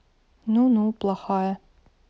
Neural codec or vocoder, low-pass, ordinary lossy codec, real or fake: none; none; none; real